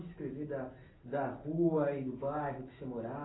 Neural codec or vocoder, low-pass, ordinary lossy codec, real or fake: none; 7.2 kHz; AAC, 16 kbps; real